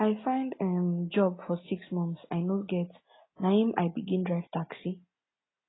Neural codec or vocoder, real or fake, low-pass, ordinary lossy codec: none; real; 7.2 kHz; AAC, 16 kbps